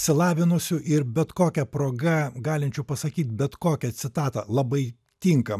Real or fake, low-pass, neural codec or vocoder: real; 14.4 kHz; none